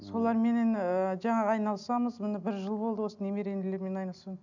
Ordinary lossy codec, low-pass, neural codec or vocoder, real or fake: none; 7.2 kHz; none; real